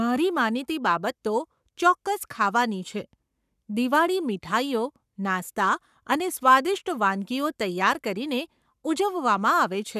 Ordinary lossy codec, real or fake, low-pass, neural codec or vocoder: none; fake; 14.4 kHz; codec, 44.1 kHz, 7.8 kbps, Pupu-Codec